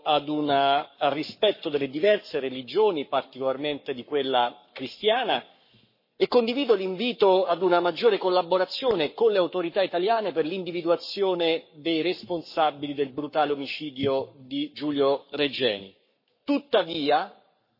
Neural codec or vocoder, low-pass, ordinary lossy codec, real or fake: codec, 44.1 kHz, 7.8 kbps, Pupu-Codec; 5.4 kHz; MP3, 24 kbps; fake